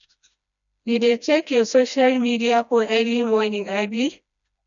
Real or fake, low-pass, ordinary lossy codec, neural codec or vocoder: fake; 7.2 kHz; none; codec, 16 kHz, 1 kbps, FreqCodec, smaller model